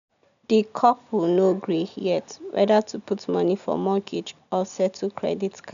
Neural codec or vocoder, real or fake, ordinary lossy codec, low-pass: none; real; none; 7.2 kHz